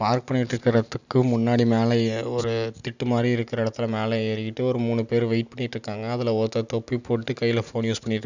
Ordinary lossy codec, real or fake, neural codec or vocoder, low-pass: none; real; none; 7.2 kHz